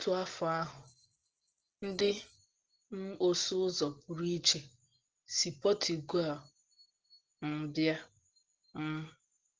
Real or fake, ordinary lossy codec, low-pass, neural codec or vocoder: real; Opus, 16 kbps; 7.2 kHz; none